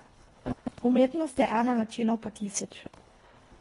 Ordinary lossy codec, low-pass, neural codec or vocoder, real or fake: AAC, 32 kbps; 10.8 kHz; codec, 24 kHz, 1.5 kbps, HILCodec; fake